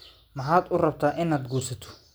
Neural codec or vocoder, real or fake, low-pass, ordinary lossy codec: none; real; none; none